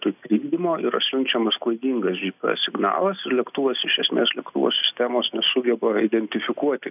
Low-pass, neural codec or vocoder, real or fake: 3.6 kHz; none; real